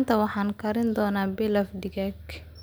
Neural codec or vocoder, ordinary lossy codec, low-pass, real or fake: none; none; none; real